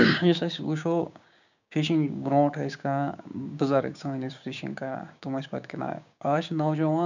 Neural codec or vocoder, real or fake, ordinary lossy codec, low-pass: codec, 16 kHz, 6 kbps, DAC; fake; none; 7.2 kHz